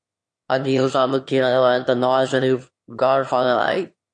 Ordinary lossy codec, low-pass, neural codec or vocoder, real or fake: MP3, 48 kbps; 9.9 kHz; autoencoder, 22.05 kHz, a latent of 192 numbers a frame, VITS, trained on one speaker; fake